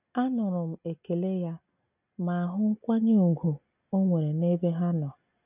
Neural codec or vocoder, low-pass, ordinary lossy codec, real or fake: none; 3.6 kHz; none; real